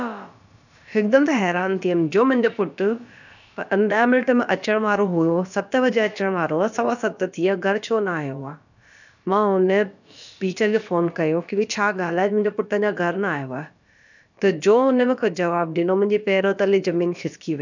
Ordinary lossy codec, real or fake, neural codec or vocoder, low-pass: none; fake; codec, 16 kHz, about 1 kbps, DyCAST, with the encoder's durations; 7.2 kHz